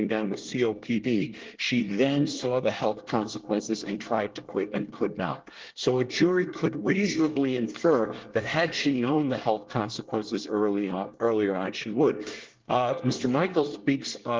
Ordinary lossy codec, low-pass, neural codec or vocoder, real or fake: Opus, 16 kbps; 7.2 kHz; codec, 24 kHz, 1 kbps, SNAC; fake